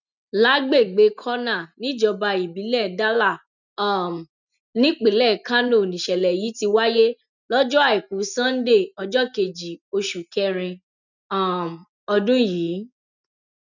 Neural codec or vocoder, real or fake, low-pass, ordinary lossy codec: none; real; 7.2 kHz; none